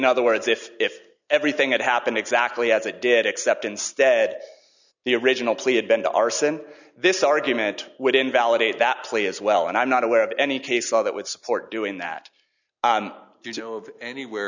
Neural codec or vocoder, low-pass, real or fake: none; 7.2 kHz; real